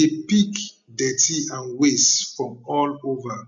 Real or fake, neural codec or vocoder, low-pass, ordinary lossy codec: real; none; 7.2 kHz; none